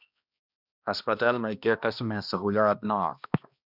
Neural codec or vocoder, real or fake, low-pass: codec, 16 kHz, 1 kbps, X-Codec, HuBERT features, trained on balanced general audio; fake; 5.4 kHz